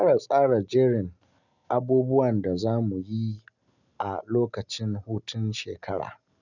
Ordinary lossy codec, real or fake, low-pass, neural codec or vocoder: none; real; 7.2 kHz; none